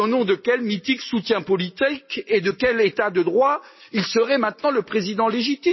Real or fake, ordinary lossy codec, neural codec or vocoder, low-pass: real; MP3, 24 kbps; none; 7.2 kHz